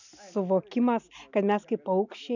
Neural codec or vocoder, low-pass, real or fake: none; 7.2 kHz; real